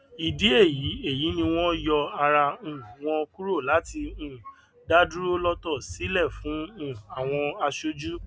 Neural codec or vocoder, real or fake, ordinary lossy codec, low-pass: none; real; none; none